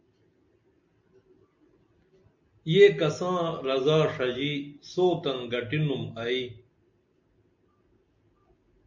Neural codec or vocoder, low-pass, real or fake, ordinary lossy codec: none; 7.2 kHz; real; MP3, 64 kbps